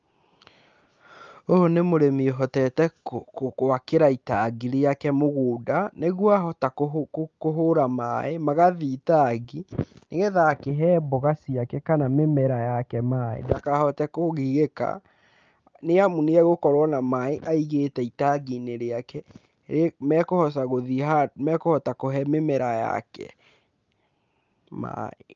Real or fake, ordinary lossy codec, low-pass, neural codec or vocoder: real; Opus, 24 kbps; 7.2 kHz; none